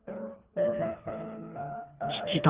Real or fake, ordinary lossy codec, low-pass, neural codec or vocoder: fake; Opus, 32 kbps; 3.6 kHz; codec, 24 kHz, 3 kbps, HILCodec